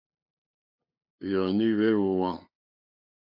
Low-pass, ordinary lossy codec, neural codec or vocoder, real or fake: 5.4 kHz; AAC, 48 kbps; codec, 16 kHz, 2 kbps, FunCodec, trained on LibriTTS, 25 frames a second; fake